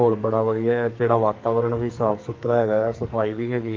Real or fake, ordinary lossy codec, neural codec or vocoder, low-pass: fake; Opus, 32 kbps; codec, 44.1 kHz, 2.6 kbps, SNAC; 7.2 kHz